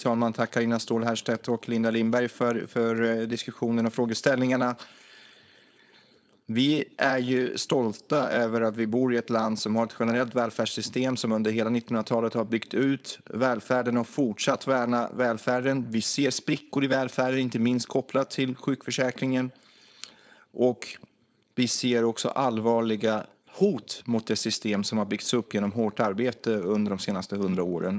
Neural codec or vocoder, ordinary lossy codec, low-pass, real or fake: codec, 16 kHz, 4.8 kbps, FACodec; none; none; fake